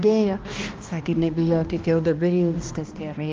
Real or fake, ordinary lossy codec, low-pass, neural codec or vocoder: fake; Opus, 32 kbps; 7.2 kHz; codec, 16 kHz, 1 kbps, X-Codec, HuBERT features, trained on balanced general audio